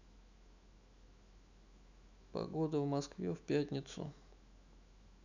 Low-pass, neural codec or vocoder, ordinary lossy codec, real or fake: 7.2 kHz; autoencoder, 48 kHz, 128 numbers a frame, DAC-VAE, trained on Japanese speech; none; fake